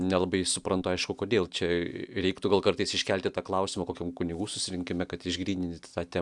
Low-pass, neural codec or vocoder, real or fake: 10.8 kHz; none; real